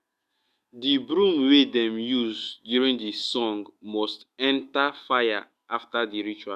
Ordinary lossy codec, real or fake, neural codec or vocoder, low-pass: Opus, 64 kbps; fake; autoencoder, 48 kHz, 128 numbers a frame, DAC-VAE, trained on Japanese speech; 14.4 kHz